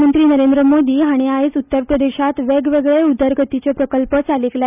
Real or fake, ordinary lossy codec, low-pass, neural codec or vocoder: real; none; 3.6 kHz; none